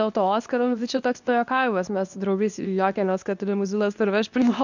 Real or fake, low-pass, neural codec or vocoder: fake; 7.2 kHz; codec, 24 kHz, 0.9 kbps, WavTokenizer, medium speech release version 2